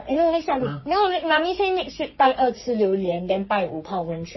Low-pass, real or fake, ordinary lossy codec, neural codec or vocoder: 7.2 kHz; fake; MP3, 24 kbps; codec, 44.1 kHz, 3.4 kbps, Pupu-Codec